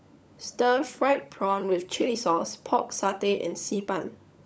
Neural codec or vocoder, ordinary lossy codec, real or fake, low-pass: codec, 16 kHz, 16 kbps, FunCodec, trained on LibriTTS, 50 frames a second; none; fake; none